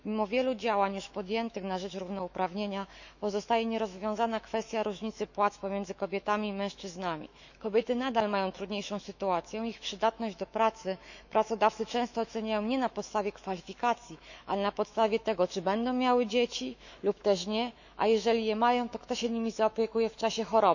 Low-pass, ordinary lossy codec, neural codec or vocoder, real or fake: 7.2 kHz; none; autoencoder, 48 kHz, 128 numbers a frame, DAC-VAE, trained on Japanese speech; fake